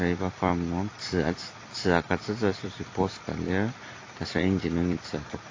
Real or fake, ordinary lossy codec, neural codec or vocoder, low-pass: fake; AAC, 32 kbps; vocoder, 44.1 kHz, 128 mel bands every 256 samples, BigVGAN v2; 7.2 kHz